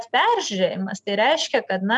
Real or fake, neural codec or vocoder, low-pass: real; none; 10.8 kHz